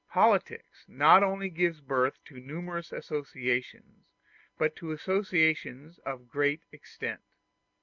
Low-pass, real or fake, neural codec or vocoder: 7.2 kHz; real; none